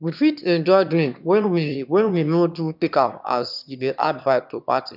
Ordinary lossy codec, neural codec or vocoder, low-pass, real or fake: none; autoencoder, 22.05 kHz, a latent of 192 numbers a frame, VITS, trained on one speaker; 5.4 kHz; fake